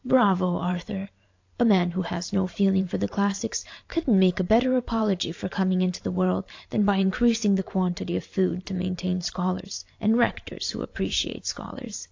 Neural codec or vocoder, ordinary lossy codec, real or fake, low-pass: vocoder, 44.1 kHz, 128 mel bands every 512 samples, BigVGAN v2; AAC, 48 kbps; fake; 7.2 kHz